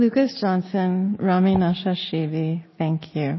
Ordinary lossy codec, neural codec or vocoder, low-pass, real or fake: MP3, 24 kbps; none; 7.2 kHz; real